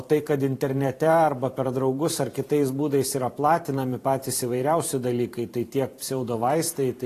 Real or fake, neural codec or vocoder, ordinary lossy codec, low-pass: fake; vocoder, 44.1 kHz, 128 mel bands every 512 samples, BigVGAN v2; AAC, 48 kbps; 14.4 kHz